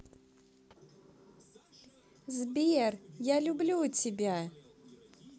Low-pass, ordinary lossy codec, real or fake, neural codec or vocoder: none; none; real; none